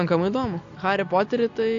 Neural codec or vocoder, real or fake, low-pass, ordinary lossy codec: none; real; 7.2 kHz; AAC, 48 kbps